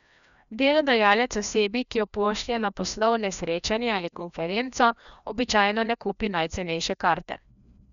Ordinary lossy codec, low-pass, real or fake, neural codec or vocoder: none; 7.2 kHz; fake; codec, 16 kHz, 1 kbps, FreqCodec, larger model